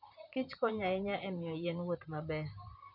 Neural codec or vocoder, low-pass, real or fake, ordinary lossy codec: vocoder, 44.1 kHz, 128 mel bands, Pupu-Vocoder; 5.4 kHz; fake; none